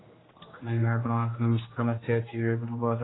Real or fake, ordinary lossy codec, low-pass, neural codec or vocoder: fake; AAC, 16 kbps; 7.2 kHz; codec, 16 kHz, 1 kbps, X-Codec, HuBERT features, trained on general audio